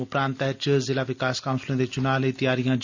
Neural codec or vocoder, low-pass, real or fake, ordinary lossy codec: none; 7.2 kHz; real; none